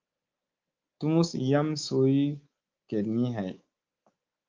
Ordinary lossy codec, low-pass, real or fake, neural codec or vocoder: Opus, 24 kbps; 7.2 kHz; fake; codec, 24 kHz, 3.1 kbps, DualCodec